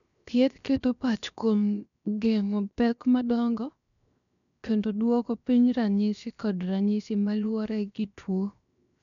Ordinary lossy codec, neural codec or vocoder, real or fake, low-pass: none; codec, 16 kHz, 0.7 kbps, FocalCodec; fake; 7.2 kHz